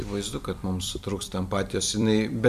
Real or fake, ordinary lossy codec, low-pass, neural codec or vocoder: fake; MP3, 96 kbps; 14.4 kHz; vocoder, 44.1 kHz, 128 mel bands every 256 samples, BigVGAN v2